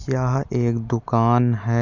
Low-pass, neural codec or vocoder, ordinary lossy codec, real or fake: 7.2 kHz; none; none; real